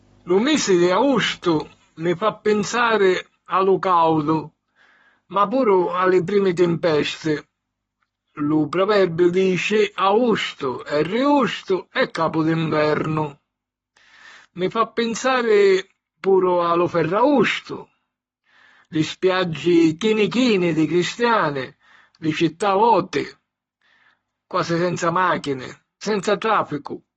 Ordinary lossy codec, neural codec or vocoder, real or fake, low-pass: AAC, 24 kbps; codec, 44.1 kHz, 7.8 kbps, Pupu-Codec; fake; 19.8 kHz